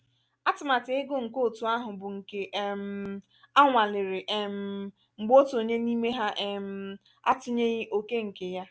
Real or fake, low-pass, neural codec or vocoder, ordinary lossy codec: real; none; none; none